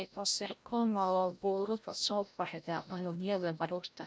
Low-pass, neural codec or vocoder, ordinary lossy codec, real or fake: none; codec, 16 kHz, 0.5 kbps, FreqCodec, larger model; none; fake